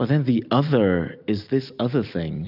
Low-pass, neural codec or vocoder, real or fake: 5.4 kHz; none; real